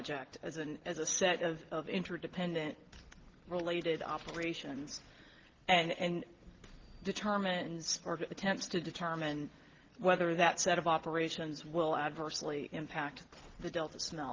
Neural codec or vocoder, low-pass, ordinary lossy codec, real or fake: none; 7.2 kHz; Opus, 16 kbps; real